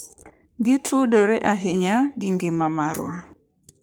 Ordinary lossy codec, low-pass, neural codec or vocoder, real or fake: none; none; codec, 44.1 kHz, 3.4 kbps, Pupu-Codec; fake